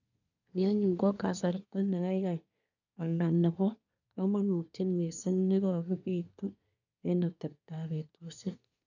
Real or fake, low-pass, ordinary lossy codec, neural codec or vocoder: fake; 7.2 kHz; none; codec, 24 kHz, 1 kbps, SNAC